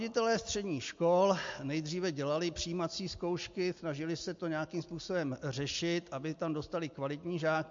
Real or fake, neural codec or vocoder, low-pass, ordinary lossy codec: real; none; 7.2 kHz; MP3, 48 kbps